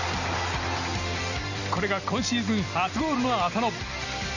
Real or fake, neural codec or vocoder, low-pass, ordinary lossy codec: real; none; 7.2 kHz; none